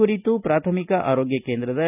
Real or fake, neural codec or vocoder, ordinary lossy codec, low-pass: real; none; none; 3.6 kHz